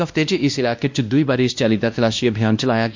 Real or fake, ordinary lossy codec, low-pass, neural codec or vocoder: fake; MP3, 64 kbps; 7.2 kHz; codec, 16 kHz, 1 kbps, X-Codec, WavLM features, trained on Multilingual LibriSpeech